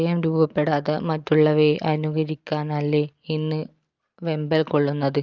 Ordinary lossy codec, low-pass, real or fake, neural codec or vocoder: Opus, 24 kbps; 7.2 kHz; real; none